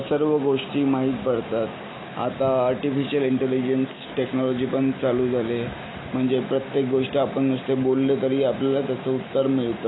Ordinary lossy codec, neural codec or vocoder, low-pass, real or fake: AAC, 16 kbps; none; 7.2 kHz; real